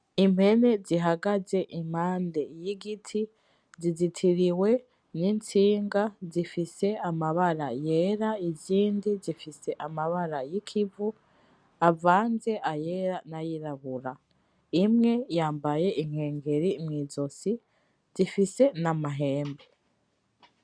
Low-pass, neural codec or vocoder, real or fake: 9.9 kHz; none; real